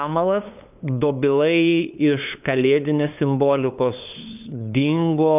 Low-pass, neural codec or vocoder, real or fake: 3.6 kHz; codec, 16 kHz, 2 kbps, FunCodec, trained on LibriTTS, 25 frames a second; fake